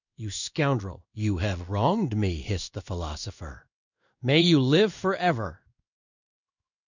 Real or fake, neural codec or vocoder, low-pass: fake; codec, 16 kHz in and 24 kHz out, 1 kbps, XY-Tokenizer; 7.2 kHz